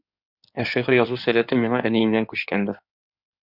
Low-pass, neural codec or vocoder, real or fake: 5.4 kHz; codec, 16 kHz in and 24 kHz out, 2.2 kbps, FireRedTTS-2 codec; fake